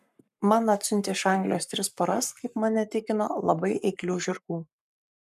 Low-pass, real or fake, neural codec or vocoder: 14.4 kHz; fake; codec, 44.1 kHz, 7.8 kbps, Pupu-Codec